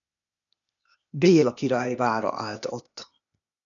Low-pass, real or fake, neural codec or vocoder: 7.2 kHz; fake; codec, 16 kHz, 0.8 kbps, ZipCodec